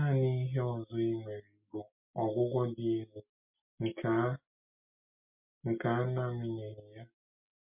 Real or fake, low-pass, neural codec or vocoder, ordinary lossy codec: real; 3.6 kHz; none; MP3, 32 kbps